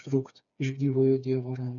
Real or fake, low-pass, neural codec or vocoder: fake; 7.2 kHz; codec, 16 kHz, 4 kbps, FreqCodec, smaller model